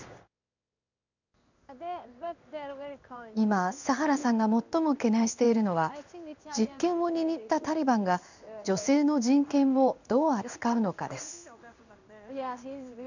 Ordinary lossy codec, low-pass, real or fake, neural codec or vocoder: none; 7.2 kHz; fake; codec, 16 kHz in and 24 kHz out, 1 kbps, XY-Tokenizer